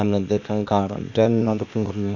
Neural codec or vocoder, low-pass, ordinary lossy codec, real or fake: codec, 16 kHz, 0.8 kbps, ZipCodec; 7.2 kHz; none; fake